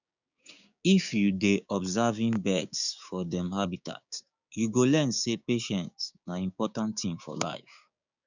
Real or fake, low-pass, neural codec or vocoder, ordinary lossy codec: fake; 7.2 kHz; codec, 16 kHz, 6 kbps, DAC; none